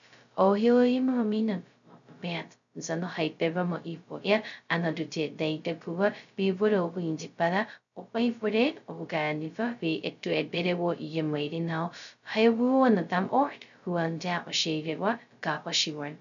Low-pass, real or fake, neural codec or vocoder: 7.2 kHz; fake; codec, 16 kHz, 0.2 kbps, FocalCodec